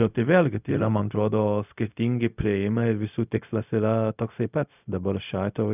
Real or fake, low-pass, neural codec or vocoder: fake; 3.6 kHz; codec, 16 kHz, 0.4 kbps, LongCat-Audio-Codec